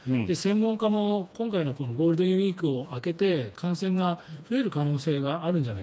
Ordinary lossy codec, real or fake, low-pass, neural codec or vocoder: none; fake; none; codec, 16 kHz, 2 kbps, FreqCodec, smaller model